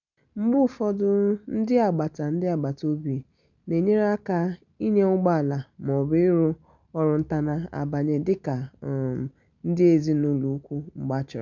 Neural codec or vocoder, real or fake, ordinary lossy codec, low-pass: none; real; none; 7.2 kHz